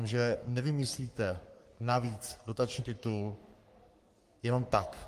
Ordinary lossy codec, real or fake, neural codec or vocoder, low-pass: Opus, 32 kbps; fake; codec, 44.1 kHz, 3.4 kbps, Pupu-Codec; 14.4 kHz